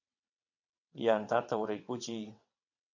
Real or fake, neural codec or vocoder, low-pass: fake; vocoder, 22.05 kHz, 80 mel bands, WaveNeXt; 7.2 kHz